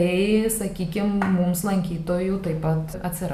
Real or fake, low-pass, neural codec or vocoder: real; 14.4 kHz; none